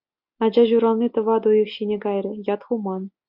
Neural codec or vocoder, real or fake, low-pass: none; real; 5.4 kHz